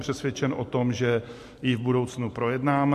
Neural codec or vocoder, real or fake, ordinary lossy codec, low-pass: none; real; MP3, 64 kbps; 14.4 kHz